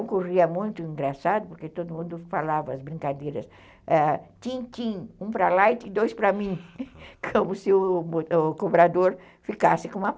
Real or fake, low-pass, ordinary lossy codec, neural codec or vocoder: real; none; none; none